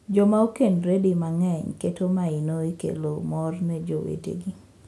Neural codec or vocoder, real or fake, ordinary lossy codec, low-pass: none; real; none; none